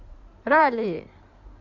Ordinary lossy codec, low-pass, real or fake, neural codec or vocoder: none; 7.2 kHz; fake; codec, 16 kHz in and 24 kHz out, 2.2 kbps, FireRedTTS-2 codec